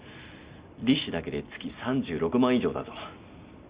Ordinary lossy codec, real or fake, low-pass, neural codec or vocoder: Opus, 64 kbps; real; 3.6 kHz; none